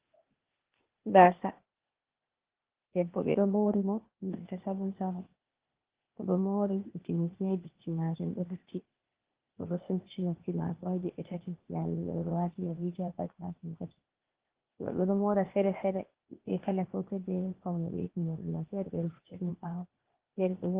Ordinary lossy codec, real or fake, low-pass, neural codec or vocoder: Opus, 16 kbps; fake; 3.6 kHz; codec, 16 kHz, 0.8 kbps, ZipCodec